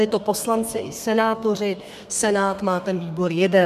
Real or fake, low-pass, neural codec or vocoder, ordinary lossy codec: fake; 14.4 kHz; codec, 32 kHz, 1.9 kbps, SNAC; MP3, 96 kbps